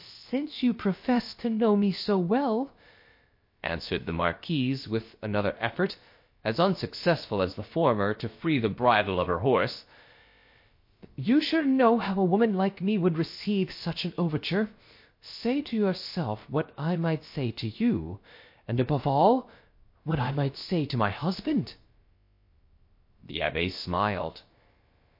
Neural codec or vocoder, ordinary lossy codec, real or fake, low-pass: codec, 16 kHz, about 1 kbps, DyCAST, with the encoder's durations; MP3, 32 kbps; fake; 5.4 kHz